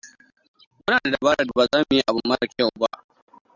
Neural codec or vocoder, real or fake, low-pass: none; real; 7.2 kHz